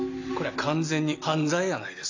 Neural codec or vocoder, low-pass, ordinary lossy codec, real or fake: none; 7.2 kHz; AAC, 48 kbps; real